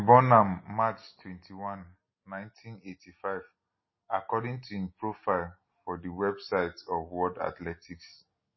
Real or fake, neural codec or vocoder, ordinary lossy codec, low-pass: real; none; MP3, 24 kbps; 7.2 kHz